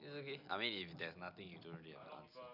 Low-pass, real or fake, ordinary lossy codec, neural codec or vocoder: 5.4 kHz; real; none; none